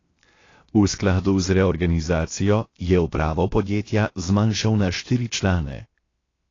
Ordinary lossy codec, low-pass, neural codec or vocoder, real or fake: AAC, 32 kbps; 7.2 kHz; codec, 16 kHz, 0.7 kbps, FocalCodec; fake